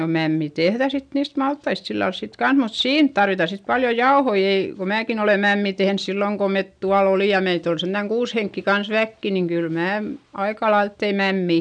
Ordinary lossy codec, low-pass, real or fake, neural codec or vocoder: none; 9.9 kHz; real; none